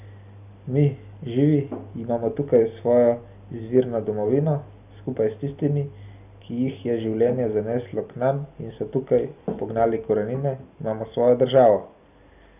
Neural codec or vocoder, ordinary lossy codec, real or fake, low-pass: none; none; real; 3.6 kHz